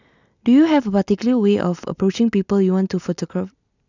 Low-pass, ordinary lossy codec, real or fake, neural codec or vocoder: 7.2 kHz; none; real; none